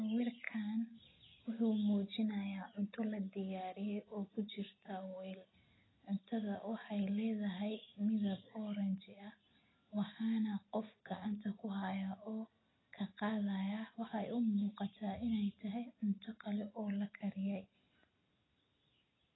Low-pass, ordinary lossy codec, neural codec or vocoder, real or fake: 7.2 kHz; AAC, 16 kbps; none; real